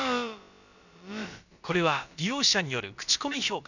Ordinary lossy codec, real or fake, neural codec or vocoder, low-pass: none; fake; codec, 16 kHz, about 1 kbps, DyCAST, with the encoder's durations; 7.2 kHz